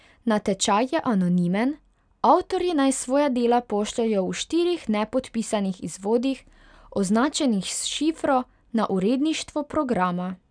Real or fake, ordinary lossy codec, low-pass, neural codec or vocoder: real; none; 9.9 kHz; none